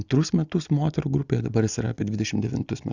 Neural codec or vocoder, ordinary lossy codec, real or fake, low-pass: none; Opus, 64 kbps; real; 7.2 kHz